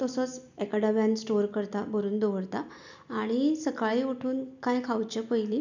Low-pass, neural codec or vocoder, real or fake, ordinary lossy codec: 7.2 kHz; none; real; none